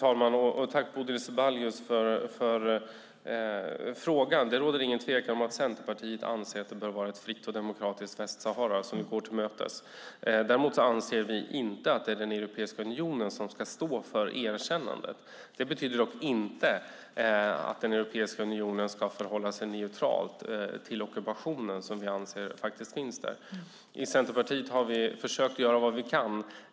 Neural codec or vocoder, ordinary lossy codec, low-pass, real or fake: none; none; none; real